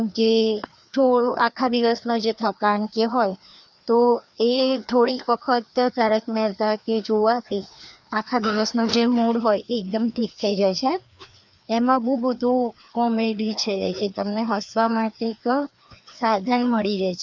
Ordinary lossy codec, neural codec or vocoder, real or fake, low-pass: none; codec, 16 kHz, 2 kbps, FreqCodec, larger model; fake; 7.2 kHz